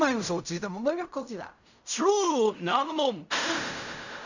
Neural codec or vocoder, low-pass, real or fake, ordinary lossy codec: codec, 16 kHz in and 24 kHz out, 0.4 kbps, LongCat-Audio-Codec, fine tuned four codebook decoder; 7.2 kHz; fake; none